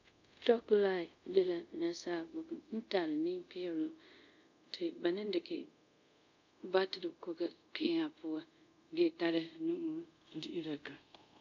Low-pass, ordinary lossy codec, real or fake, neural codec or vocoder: 7.2 kHz; MP3, 64 kbps; fake; codec, 24 kHz, 0.5 kbps, DualCodec